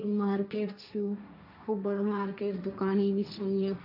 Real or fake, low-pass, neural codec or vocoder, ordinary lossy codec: fake; 5.4 kHz; codec, 16 kHz, 1.1 kbps, Voila-Tokenizer; none